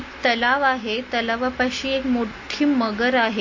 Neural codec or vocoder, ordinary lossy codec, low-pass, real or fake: none; MP3, 32 kbps; 7.2 kHz; real